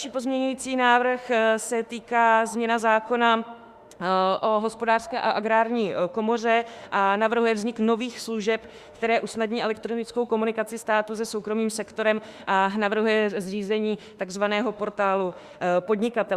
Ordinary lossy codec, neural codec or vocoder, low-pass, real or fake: Opus, 64 kbps; autoencoder, 48 kHz, 32 numbers a frame, DAC-VAE, trained on Japanese speech; 14.4 kHz; fake